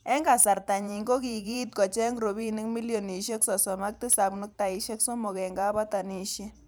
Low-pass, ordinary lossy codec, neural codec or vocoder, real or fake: none; none; vocoder, 44.1 kHz, 128 mel bands every 512 samples, BigVGAN v2; fake